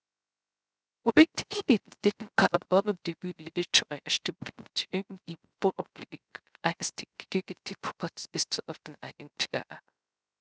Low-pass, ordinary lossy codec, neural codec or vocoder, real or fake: none; none; codec, 16 kHz, 0.3 kbps, FocalCodec; fake